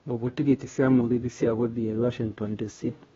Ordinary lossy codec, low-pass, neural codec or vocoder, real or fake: AAC, 24 kbps; 7.2 kHz; codec, 16 kHz, 0.5 kbps, FunCodec, trained on Chinese and English, 25 frames a second; fake